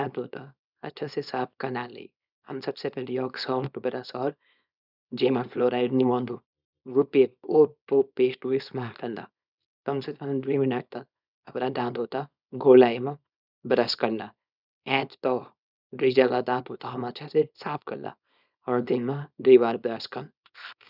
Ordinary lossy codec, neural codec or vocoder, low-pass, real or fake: none; codec, 24 kHz, 0.9 kbps, WavTokenizer, small release; 5.4 kHz; fake